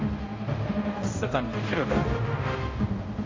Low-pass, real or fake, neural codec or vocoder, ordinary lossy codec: 7.2 kHz; fake; codec, 16 kHz, 0.5 kbps, X-Codec, HuBERT features, trained on general audio; MP3, 32 kbps